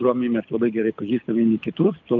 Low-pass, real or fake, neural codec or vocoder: 7.2 kHz; fake; codec, 24 kHz, 6 kbps, HILCodec